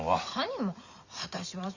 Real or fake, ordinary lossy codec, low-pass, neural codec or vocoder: fake; Opus, 64 kbps; 7.2 kHz; vocoder, 44.1 kHz, 128 mel bands every 256 samples, BigVGAN v2